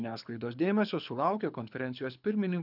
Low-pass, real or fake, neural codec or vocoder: 5.4 kHz; fake; codec, 24 kHz, 6 kbps, HILCodec